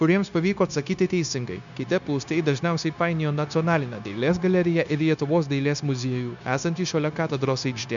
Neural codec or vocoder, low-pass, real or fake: codec, 16 kHz, 0.9 kbps, LongCat-Audio-Codec; 7.2 kHz; fake